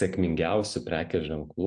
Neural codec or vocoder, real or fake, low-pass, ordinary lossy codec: none; real; 9.9 kHz; AAC, 64 kbps